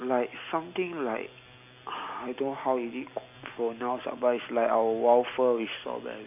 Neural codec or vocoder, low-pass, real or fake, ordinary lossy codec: none; 3.6 kHz; real; none